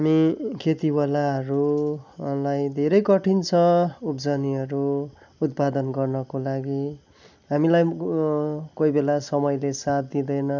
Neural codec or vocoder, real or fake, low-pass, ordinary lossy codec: none; real; 7.2 kHz; none